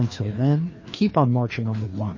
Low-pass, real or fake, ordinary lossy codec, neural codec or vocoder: 7.2 kHz; fake; MP3, 32 kbps; codec, 16 kHz, 2 kbps, FreqCodec, larger model